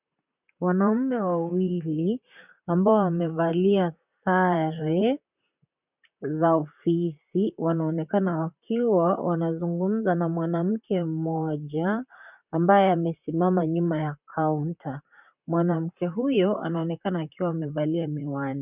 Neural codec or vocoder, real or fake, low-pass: vocoder, 44.1 kHz, 128 mel bands, Pupu-Vocoder; fake; 3.6 kHz